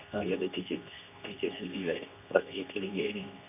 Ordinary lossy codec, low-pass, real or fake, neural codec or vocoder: none; 3.6 kHz; fake; codec, 24 kHz, 0.9 kbps, WavTokenizer, medium speech release version 2